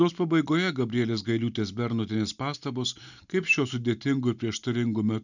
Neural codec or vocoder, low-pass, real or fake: none; 7.2 kHz; real